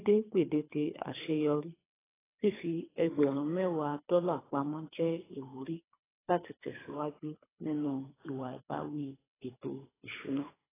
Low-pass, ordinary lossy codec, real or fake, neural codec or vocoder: 3.6 kHz; AAC, 16 kbps; fake; codec, 24 kHz, 3 kbps, HILCodec